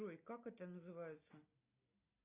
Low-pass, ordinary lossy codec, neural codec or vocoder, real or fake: 3.6 kHz; AAC, 24 kbps; none; real